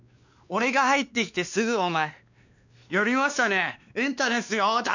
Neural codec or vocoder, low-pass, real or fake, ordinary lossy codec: codec, 16 kHz, 2 kbps, X-Codec, WavLM features, trained on Multilingual LibriSpeech; 7.2 kHz; fake; none